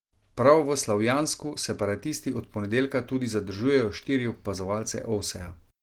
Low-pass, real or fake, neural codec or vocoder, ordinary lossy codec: 19.8 kHz; real; none; Opus, 16 kbps